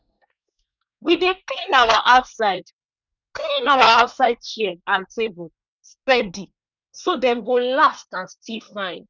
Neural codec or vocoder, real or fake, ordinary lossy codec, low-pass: codec, 24 kHz, 1 kbps, SNAC; fake; none; 7.2 kHz